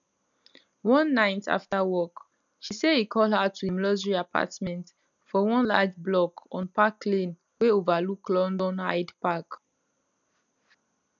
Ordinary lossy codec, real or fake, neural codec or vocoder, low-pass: none; real; none; 7.2 kHz